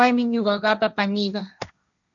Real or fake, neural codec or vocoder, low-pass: fake; codec, 16 kHz, 1.1 kbps, Voila-Tokenizer; 7.2 kHz